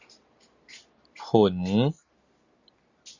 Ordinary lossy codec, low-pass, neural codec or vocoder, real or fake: Opus, 64 kbps; 7.2 kHz; none; real